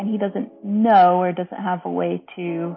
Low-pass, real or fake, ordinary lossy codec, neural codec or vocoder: 7.2 kHz; real; MP3, 24 kbps; none